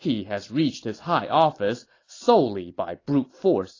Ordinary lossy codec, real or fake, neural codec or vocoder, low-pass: AAC, 32 kbps; real; none; 7.2 kHz